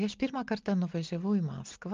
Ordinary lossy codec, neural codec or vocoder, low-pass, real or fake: Opus, 24 kbps; none; 7.2 kHz; real